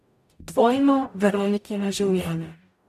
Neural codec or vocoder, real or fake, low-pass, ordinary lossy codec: codec, 44.1 kHz, 0.9 kbps, DAC; fake; 14.4 kHz; none